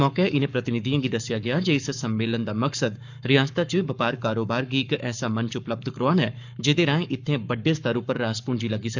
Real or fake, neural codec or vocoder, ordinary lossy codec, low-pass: fake; codec, 44.1 kHz, 7.8 kbps, Pupu-Codec; none; 7.2 kHz